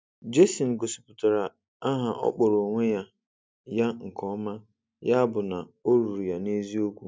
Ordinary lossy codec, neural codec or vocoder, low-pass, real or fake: none; none; none; real